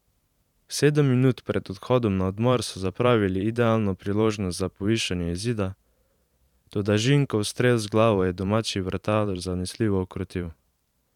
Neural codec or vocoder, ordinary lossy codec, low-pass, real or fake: vocoder, 44.1 kHz, 128 mel bands every 512 samples, BigVGAN v2; none; 19.8 kHz; fake